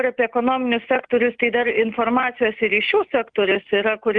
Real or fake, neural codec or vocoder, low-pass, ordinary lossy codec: real; none; 9.9 kHz; Opus, 32 kbps